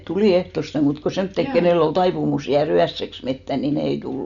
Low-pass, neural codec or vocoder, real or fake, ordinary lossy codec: 7.2 kHz; none; real; none